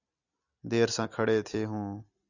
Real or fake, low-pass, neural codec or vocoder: real; 7.2 kHz; none